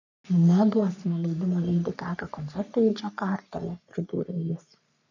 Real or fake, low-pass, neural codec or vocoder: fake; 7.2 kHz; codec, 44.1 kHz, 3.4 kbps, Pupu-Codec